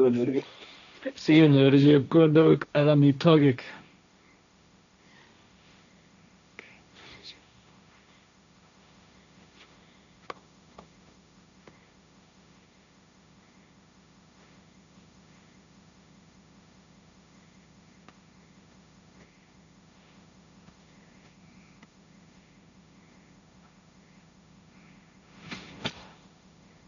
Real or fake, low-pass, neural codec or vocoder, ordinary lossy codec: fake; 7.2 kHz; codec, 16 kHz, 1.1 kbps, Voila-Tokenizer; none